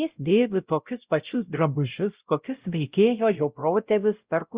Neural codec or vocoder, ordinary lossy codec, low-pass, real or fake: codec, 16 kHz, 0.5 kbps, X-Codec, WavLM features, trained on Multilingual LibriSpeech; Opus, 64 kbps; 3.6 kHz; fake